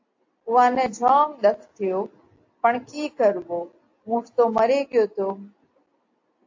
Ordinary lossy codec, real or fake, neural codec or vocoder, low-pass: AAC, 48 kbps; real; none; 7.2 kHz